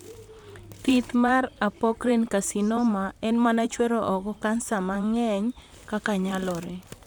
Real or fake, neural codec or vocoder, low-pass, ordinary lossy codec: fake; vocoder, 44.1 kHz, 128 mel bands, Pupu-Vocoder; none; none